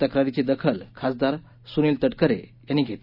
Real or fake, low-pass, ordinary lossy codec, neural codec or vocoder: real; 5.4 kHz; none; none